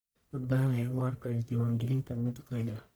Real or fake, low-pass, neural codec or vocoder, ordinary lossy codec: fake; none; codec, 44.1 kHz, 1.7 kbps, Pupu-Codec; none